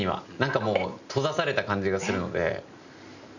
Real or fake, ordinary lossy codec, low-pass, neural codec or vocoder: real; none; 7.2 kHz; none